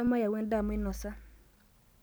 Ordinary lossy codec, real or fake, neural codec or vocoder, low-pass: none; real; none; none